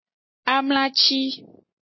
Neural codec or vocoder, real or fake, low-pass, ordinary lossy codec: none; real; 7.2 kHz; MP3, 24 kbps